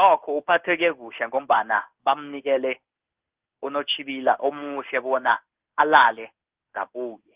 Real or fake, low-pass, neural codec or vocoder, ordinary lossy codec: fake; 3.6 kHz; codec, 16 kHz in and 24 kHz out, 1 kbps, XY-Tokenizer; Opus, 24 kbps